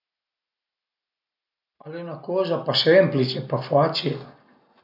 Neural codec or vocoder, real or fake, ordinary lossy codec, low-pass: none; real; none; 5.4 kHz